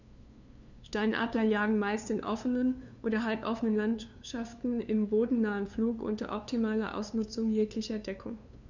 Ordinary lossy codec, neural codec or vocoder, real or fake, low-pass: none; codec, 16 kHz, 2 kbps, FunCodec, trained on LibriTTS, 25 frames a second; fake; 7.2 kHz